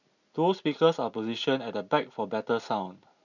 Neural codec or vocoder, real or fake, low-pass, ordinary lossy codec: none; real; 7.2 kHz; none